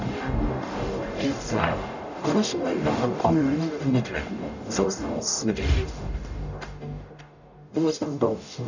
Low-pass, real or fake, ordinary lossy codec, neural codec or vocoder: 7.2 kHz; fake; none; codec, 44.1 kHz, 0.9 kbps, DAC